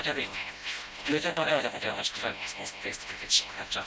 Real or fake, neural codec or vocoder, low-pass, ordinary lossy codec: fake; codec, 16 kHz, 0.5 kbps, FreqCodec, smaller model; none; none